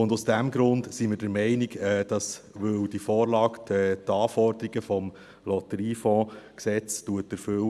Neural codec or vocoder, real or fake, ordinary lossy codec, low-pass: none; real; none; none